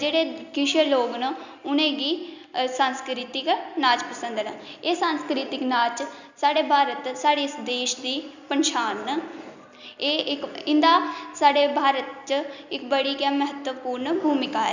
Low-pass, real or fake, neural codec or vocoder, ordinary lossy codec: 7.2 kHz; real; none; none